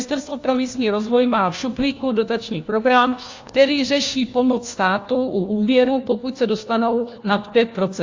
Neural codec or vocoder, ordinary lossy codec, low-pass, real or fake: codec, 16 kHz, 1 kbps, FunCodec, trained on LibriTTS, 50 frames a second; AAC, 48 kbps; 7.2 kHz; fake